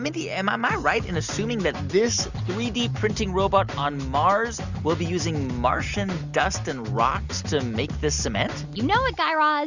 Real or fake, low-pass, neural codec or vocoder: real; 7.2 kHz; none